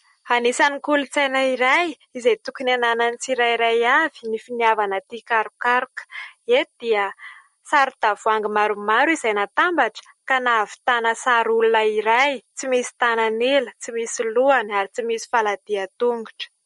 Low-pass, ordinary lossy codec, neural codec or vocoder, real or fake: 19.8 kHz; MP3, 48 kbps; none; real